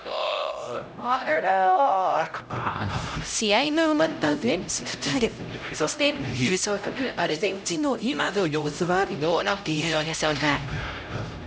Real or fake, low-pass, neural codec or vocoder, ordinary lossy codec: fake; none; codec, 16 kHz, 0.5 kbps, X-Codec, HuBERT features, trained on LibriSpeech; none